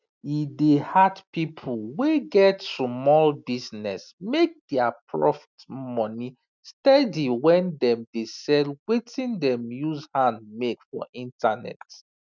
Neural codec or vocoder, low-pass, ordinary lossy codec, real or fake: none; 7.2 kHz; none; real